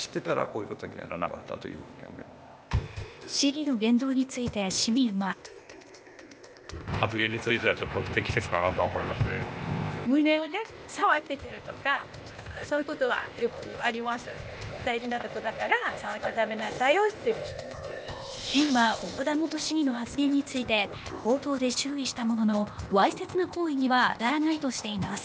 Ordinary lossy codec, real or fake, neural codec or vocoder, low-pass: none; fake; codec, 16 kHz, 0.8 kbps, ZipCodec; none